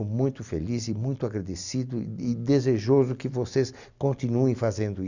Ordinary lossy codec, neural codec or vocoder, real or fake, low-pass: none; none; real; 7.2 kHz